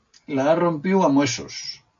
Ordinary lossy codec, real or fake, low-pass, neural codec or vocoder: MP3, 48 kbps; real; 7.2 kHz; none